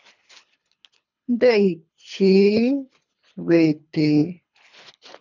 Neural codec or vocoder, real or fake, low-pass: codec, 24 kHz, 3 kbps, HILCodec; fake; 7.2 kHz